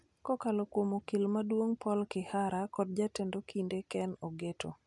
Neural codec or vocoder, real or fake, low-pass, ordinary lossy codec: none; real; 10.8 kHz; none